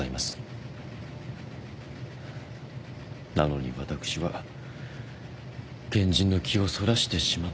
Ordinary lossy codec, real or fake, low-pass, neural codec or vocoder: none; real; none; none